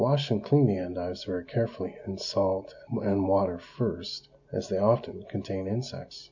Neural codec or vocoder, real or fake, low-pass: none; real; 7.2 kHz